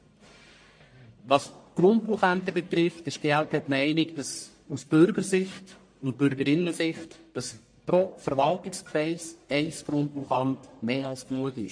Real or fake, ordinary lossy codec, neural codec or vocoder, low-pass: fake; MP3, 48 kbps; codec, 44.1 kHz, 1.7 kbps, Pupu-Codec; 9.9 kHz